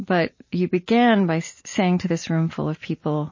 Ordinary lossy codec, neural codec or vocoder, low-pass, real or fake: MP3, 32 kbps; none; 7.2 kHz; real